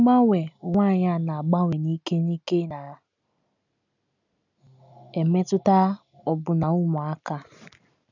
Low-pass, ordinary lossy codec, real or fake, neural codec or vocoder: 7.2 kHz; none; real; none